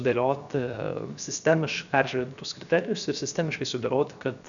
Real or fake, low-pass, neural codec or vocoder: fake; 7.2 kHz; codec, 16 kHz, 0.7 kbps, FocalCodec